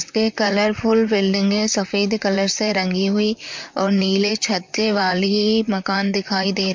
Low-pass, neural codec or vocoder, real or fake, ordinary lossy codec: 7.2 kHz; vocoder, 44.1 kHz, 128 mel bands, Pupu-Vocoder; fake; MP3, 48 kbps